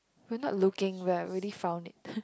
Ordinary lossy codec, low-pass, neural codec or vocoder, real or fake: none; none; none; real